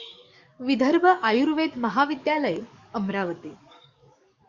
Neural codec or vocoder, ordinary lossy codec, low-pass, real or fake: codec, 44.1 kHz, 7.8 kbps, DAC; Opus, 64 kbps; 7.2 kHz; fake